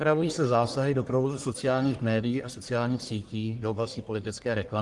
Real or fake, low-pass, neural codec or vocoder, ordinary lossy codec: fake; 10.8 kHz; codec, 44.1 kHz, 1.7 kbps, Pupu-Codec; Opus, 24 kbps